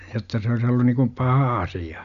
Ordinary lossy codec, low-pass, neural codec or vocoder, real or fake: none; 7.2 kHz; none; real